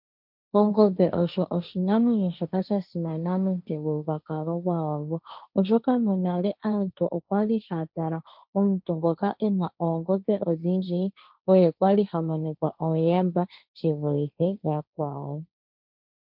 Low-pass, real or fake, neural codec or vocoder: 5.4 kHz; fake; codec, 16 kHz, 1.1 kbps, Voila-Tokenizer